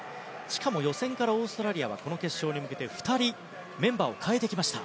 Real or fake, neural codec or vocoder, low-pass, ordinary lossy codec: real; none; none; none